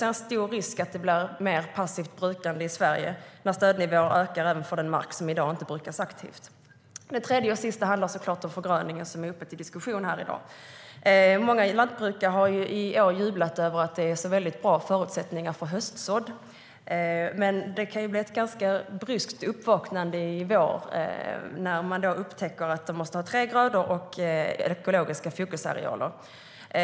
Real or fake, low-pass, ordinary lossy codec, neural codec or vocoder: real; none; none; none